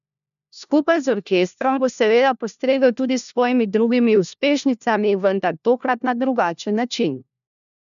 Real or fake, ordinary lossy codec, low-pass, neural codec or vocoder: fake; none; 7.2 kHz; codec, 16 kHz, 1 kbps, FunCodec, trained on LibriTTS, 50 frames a second